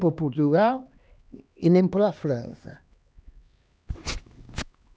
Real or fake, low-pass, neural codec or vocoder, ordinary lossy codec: fake; none; codec, 16 kHz, 2 kbps, X-Codec, HuBERT features, trained on LibriSpeech; none